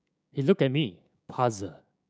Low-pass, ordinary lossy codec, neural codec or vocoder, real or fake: none; none; codec, 16 kHz, 6 kbps, DAC; fake